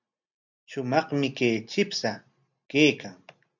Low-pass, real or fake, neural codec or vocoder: 7.2 kHz; real; none